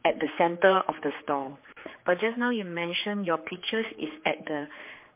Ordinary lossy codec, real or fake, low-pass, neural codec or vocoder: MP3, 24 kbps; fake; 3.6 kHz; codec, 16 kHz, 4 kbps, X-Codec, HuBERT features, trained on general audio